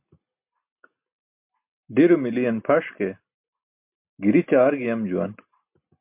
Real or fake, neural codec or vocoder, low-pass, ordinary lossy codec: real; none; 3.6 kHz; MP3, 32 kbps